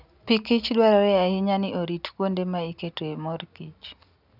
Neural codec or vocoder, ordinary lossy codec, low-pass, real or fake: none; none; 5.4 kHz; real